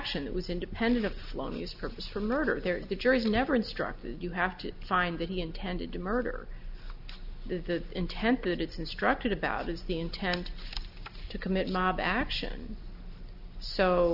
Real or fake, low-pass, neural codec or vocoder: real; 5.4 kHz; none